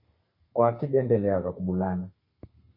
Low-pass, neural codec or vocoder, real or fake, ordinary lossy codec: 5.4 kHz; codec, 32 kHz, 1.9 kbps, SNAC; fake; MP3, 24 kbps